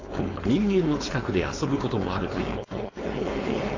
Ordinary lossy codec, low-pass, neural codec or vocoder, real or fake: AAC, 32 kbps; 7.2 kHz; codec, 16 kHz, 4.8 kbps, FACodec; fake